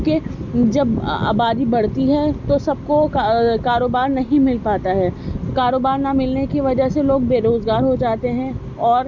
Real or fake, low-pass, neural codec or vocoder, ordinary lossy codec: real; 7.2 kHz; none; none